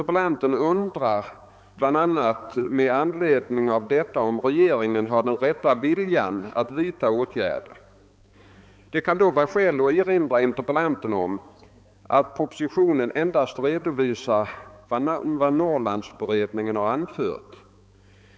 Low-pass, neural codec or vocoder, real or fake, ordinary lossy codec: none; codec, 16 kHz, 4 kbps, X-Codec, HuBERT features, trained on balanced general audio; fake; none